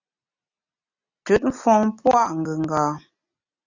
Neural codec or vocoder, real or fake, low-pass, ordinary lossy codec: none; real; 7.2 kHz; Opus, 64 kbps